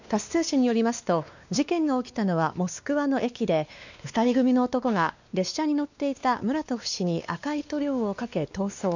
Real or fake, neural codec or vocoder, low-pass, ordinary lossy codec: fake; codec, 16 kHz, 2 kbps, X-Codec, WavLM features, trained on Multilingual LibriSpeech; 7.2 kHz; none